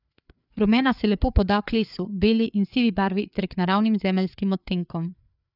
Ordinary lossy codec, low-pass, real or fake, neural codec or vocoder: none; 5.4 kHz; fake; codec, 16 kHz, 4 kbps, FreqCodec, larger model